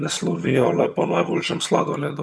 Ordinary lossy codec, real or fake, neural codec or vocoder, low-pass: none; fake; vocoder, 22.05 kHz, 80 mel bands, HiFi-GAN; none